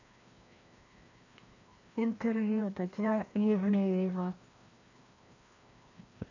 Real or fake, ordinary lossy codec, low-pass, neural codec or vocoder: fake; AAC, 48 kbps; 7.2 kHz; codec, 16 kHz, 1 kbps, FreqCodec, larger model